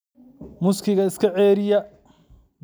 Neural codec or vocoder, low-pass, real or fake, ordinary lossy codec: none; none; real; none